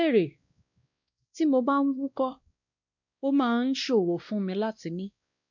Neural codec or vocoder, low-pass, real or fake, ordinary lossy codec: codec, 16 kHz, 1 kbps, X-Codec, WavLM features, trained on Multilingual LibriSpeech; 7.2 kHz; fake; none